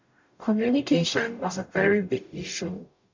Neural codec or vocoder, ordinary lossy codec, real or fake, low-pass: codec, 44.1 kHz, 0.9 kbps, DAC; MP3, 64 kbps; fake; 7.2 kHz